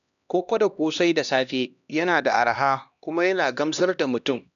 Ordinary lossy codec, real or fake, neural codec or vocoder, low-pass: none; fake; codec, 16 kHz, 1 kbps, X-Codec, HuBERT features, trained on LibriSpeech; 7.2 kHz